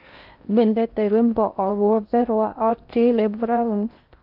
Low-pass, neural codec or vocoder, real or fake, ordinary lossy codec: 5.4 kHz; codec, 16 kHz in and 24 kHz out, 0.6 kbps, FocalCodec, streaming, 2048 codes; fake; Opus, 24 kbps